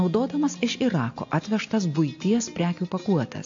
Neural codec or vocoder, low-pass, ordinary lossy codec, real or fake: none; 7.2 kHz; AAC, 48 kbps; real